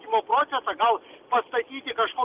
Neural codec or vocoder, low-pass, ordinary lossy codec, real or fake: none; 3.6 kHz; Opus, 24 kbps; real